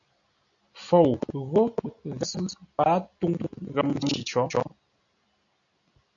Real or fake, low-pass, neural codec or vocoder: real; 7.2 kHz; none